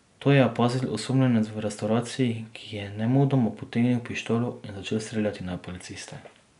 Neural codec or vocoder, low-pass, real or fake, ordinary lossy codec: none; 10.8 kHz; real; none